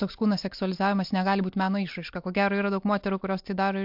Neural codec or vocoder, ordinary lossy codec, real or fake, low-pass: none; MP3, 48 kbps; real; 5.4 kHz